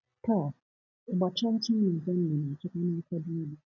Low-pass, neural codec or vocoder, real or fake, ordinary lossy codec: 7.2 kHz; none; real; none